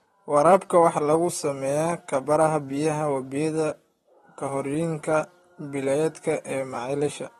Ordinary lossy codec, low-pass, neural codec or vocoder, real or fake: AAC, 32 kbps; 19.8 kHz; vocoder, 44.1 kHz, 128 mel bands, Pupu-Vocoder; fake